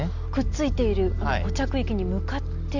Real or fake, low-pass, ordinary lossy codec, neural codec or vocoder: real; 7.2 kHz; none; none